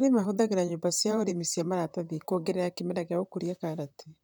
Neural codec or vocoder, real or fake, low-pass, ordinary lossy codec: vocoder, 44.1 kHz, 128 mel bands, Pupu-Vocoder; fake; none; none